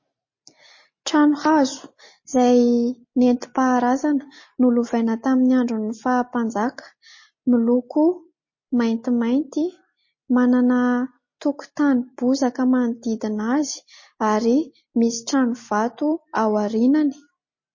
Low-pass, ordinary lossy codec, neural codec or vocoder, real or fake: 7.2 kHz; MP3, 32 kbps; none; real